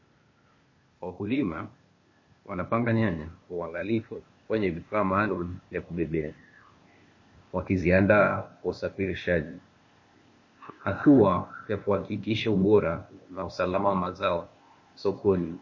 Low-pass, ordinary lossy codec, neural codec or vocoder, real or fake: 7.2 kHz; MP3, 32 kbps; codec, 16 kHz, 0.8 kbps, ZipCodec; fake